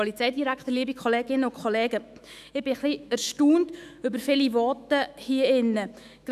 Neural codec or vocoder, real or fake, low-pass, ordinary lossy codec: autoencoder, 48 kHz, 128 numbers a frame, DAC-VAE, trained on Japanese speech; fake; 14.4 kHz; none